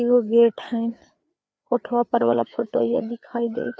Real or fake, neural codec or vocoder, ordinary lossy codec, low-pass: fake; codec, 16 kHz, 4 kbps, FreqCodec, larger model; none; none